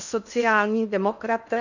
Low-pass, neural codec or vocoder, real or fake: 7.2 kHz; codec, 16 kHz in and 24 kHz out, 0.8 kbps, FocalCodec, streaming, 65536 codes; fake